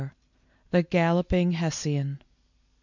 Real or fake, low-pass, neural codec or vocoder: real; 7.2 kHz; none